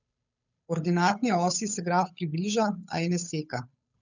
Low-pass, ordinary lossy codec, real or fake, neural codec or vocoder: 7.2 kHz; none; fake; codec, 16 kHz, 8 kbps, FunCodec, trained on Chinese and English, 25 frames a second